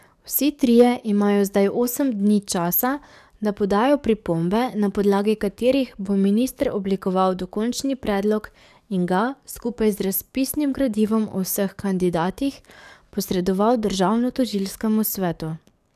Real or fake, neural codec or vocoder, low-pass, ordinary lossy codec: fake; codec, 44.1 kHz, 7.8 kbps, DAC; 14.4 kHz; none